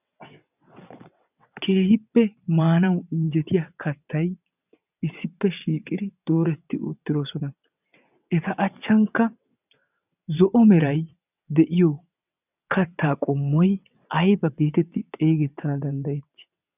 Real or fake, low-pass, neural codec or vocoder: fake; 3.6 kHz; vocoder, 22.05 kHz, 80 mel bands, WaveNeXt